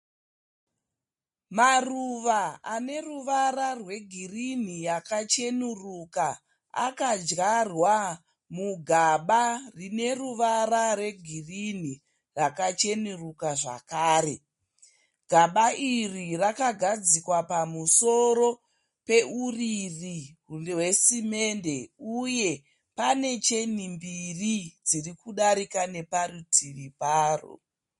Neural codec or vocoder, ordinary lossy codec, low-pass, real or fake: none; MP3, 48 kbps; 10.8 kHz; real